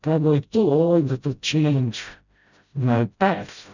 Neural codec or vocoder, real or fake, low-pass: codec, 16 kHz, 0.5 kbps, FreqCodec, smaller model; fake; 7.2 kHz